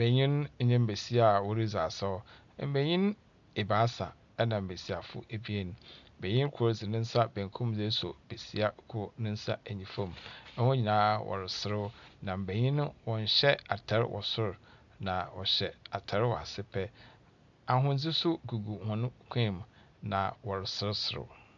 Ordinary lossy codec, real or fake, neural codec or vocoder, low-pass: AAC, 64 kbps; real; none; 7.2 kHz